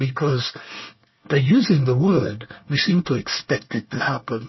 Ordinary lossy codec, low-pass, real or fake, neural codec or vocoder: MP3, 24 kbps; 7.2 kHz; fake; codec, 24 kHz, 1 kbps, SNAC